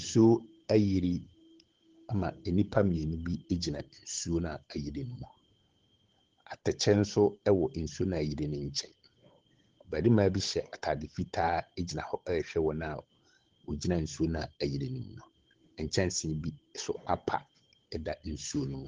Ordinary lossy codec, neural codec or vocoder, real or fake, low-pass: Opus, 16 kbps; codec, 16 kHz, 8 kbps, FunCodec, trained on Chinese and English, 25 frames a second; fake; 7.2 kHz